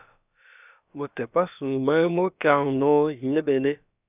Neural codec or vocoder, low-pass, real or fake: codec, 16 kHz, about 1 kbps, DyCAST, with the encoder's durations; 3.6 kHz; fake